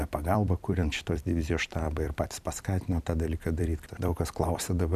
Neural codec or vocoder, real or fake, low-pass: vocoder, 44.1 kHz, 128 mel bands every 256 samples, BigVGAN v2; fake; 14.4 kHz